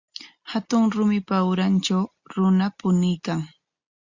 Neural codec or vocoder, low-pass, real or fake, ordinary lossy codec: none; 7.2 kHz; real; Opus, 64 kbps